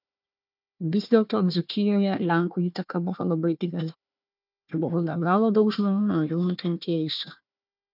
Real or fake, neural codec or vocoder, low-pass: fake; codec, 16 kHz, 1 kbps, FunCodec, trained on Chinese and English, 50 frames a second; 5.4 kHz